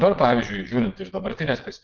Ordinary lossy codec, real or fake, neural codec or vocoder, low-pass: Opus, 16 kbps; fake; vocoder, 22.05 kHz, 80 mel bands, WaveNeXt; 7.2 kHz